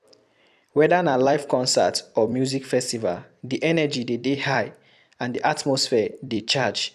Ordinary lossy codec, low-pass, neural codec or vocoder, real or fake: none; 14.4 kHz; vocoder, 44.1 kHz, 128 mel bands every 256 samples, BigVGAN v2; fake